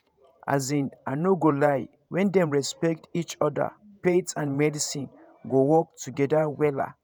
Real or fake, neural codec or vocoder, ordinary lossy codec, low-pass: fake; vocoder, 48 kHz, 128 mel bands, Vocos; none; none